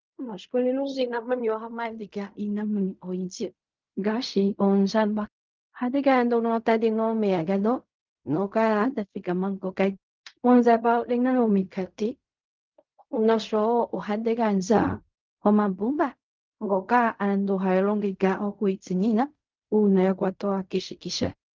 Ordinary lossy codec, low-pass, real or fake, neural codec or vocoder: Opus, 24 kbps; 7.2 kHz; fake; codec, 16 kHz in and 24 kHz out, 0.4 kbps, LongCat-Audio-Codec, fine tuned four codebook decoder